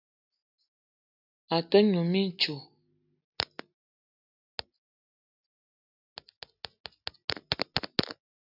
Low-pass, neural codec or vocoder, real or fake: 5.4 kHz; none; real